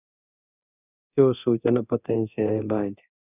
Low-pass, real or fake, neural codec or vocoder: 3.6 kHz; fake; codec, 24 kHz, 0.9 kbps, DualCodec